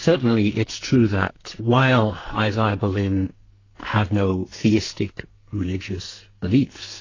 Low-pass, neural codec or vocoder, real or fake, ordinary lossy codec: 7.2 kHz; codec, 44.1 kHz, 2.6 kbps, SNAC; fake; AAC, 32 kbps